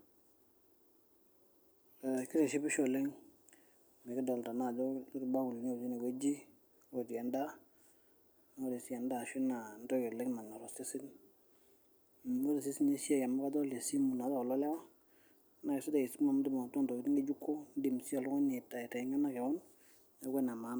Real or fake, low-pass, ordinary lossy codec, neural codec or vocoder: real; none; none; none